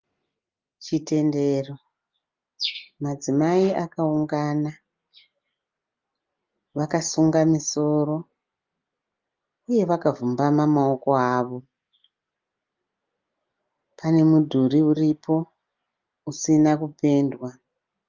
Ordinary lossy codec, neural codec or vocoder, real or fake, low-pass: Opus, 32 kbps; none; real; 7.2 kHz